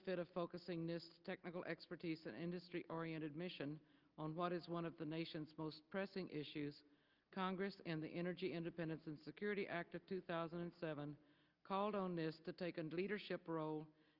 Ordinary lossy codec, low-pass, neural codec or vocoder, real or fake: Opus, 32 kbps; 5.4 kHz; none; real